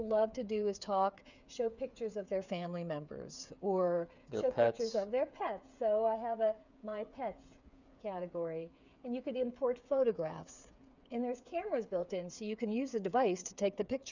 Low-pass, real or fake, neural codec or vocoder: 7.2 kHz; fake; codec, 16 kHz, 8 kbps, FreqCodec, smaller model